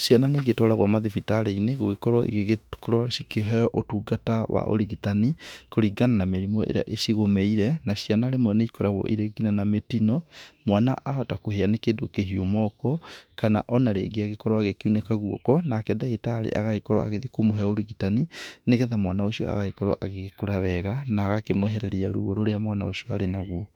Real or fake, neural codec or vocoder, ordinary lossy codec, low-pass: fake; autoencoder, 48 kHz, 32 numbers a frame, DAC-VAE, trained on Japanese speech; none; 19.8 kHz